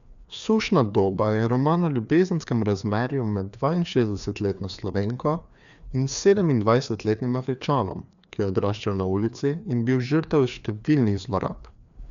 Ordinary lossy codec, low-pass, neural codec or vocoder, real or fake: none; 7.2 kHz; codec, 16 kHz, 2 kbps, FreqCodec, larger model; fake